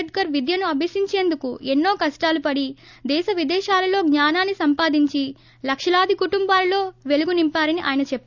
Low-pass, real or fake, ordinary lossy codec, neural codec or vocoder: 7.2 kHz; real; none; none